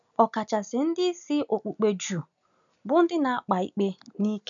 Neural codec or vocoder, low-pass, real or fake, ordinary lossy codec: none; 7.2 kHz; real; none